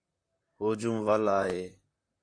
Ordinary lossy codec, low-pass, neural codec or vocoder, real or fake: AAC, 48 kbps; 9.9 kHz; vocoder, 44.1 kHz, 128 mel bands, Pupu-Vocoder; fake